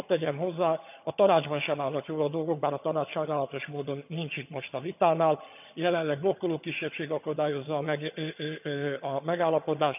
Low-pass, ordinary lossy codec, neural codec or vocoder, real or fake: 3.6 kHz; none; vocoder, 22.05 kHz, 80 mel bands, HiFi-GAN; fake